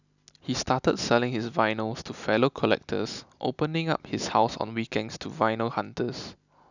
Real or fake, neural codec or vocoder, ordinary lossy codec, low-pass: real; none; none; 7.2 kHz